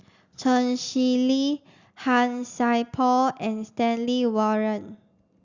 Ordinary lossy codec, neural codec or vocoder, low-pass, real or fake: Opus, 64 kbps; none; 7.2 kHz; real